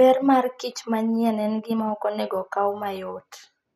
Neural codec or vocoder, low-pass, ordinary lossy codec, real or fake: none; 14.4 kHz; none; real